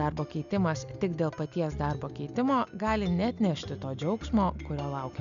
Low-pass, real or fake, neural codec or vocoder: 7.2 kHz; real; none